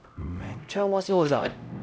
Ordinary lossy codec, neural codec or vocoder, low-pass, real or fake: none; codec, 16 kHz, 0.5 kbps, X-Codec, HuBERT features, trained on LibriSpeech; none; fake